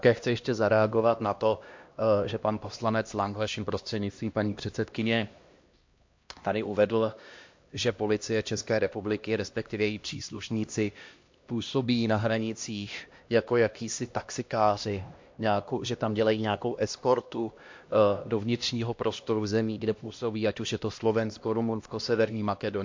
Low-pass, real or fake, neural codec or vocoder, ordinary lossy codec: 7.2 kHz; fake; codec, 16 kHz, 1 kbps, X-Codec, HuBERT features, trained on LibriSpeech; MP3, 48 kbps